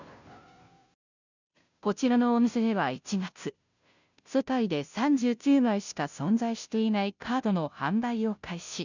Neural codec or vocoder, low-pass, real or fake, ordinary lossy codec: codec, 16 kHz, 0.5 kbps, FunCodec, trained on Chinese and English, 25 frames a second; 7.2 kHz; fake; none